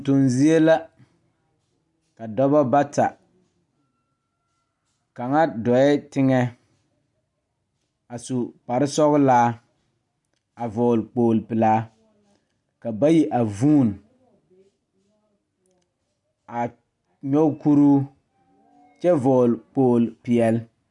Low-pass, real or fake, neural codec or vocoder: 10.8 kHz; real; none